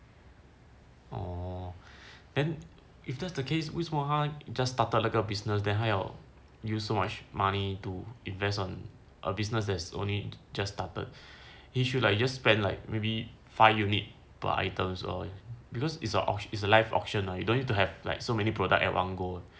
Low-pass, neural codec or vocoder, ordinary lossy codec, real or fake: none; none; none; real